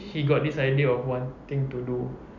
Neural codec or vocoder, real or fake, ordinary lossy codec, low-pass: none; real; none; 7.2 kHz